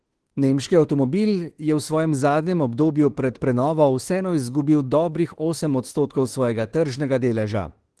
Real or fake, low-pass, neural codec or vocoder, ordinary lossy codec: fake; 10.8 kHz; autoencoder, 48 kHz, 32 numbers a frame, DAC-VAE, trained on Japanese speech; Opus, 16 kbps